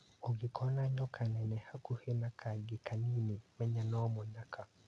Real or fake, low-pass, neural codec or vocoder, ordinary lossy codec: real; none; none; none